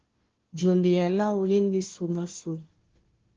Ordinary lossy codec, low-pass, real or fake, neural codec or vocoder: Opus, 16 kbps; 7.2 kHz; fake; codec, 16 kHz, 1 kbps, FunCodec, trained on Chinese and English, 50 frames a second